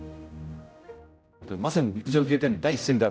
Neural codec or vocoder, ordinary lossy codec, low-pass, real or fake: codec, 16 kHz, 0.5 kbps, X-Codec, HuBERT features, trained on general audio; none; none; fake